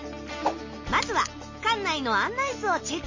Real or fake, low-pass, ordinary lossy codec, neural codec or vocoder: real; 7.2 kHz; MP3, 32 kbps; none